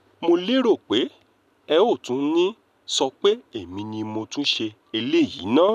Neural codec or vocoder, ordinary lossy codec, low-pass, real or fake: none; none; 14.4 kHz; real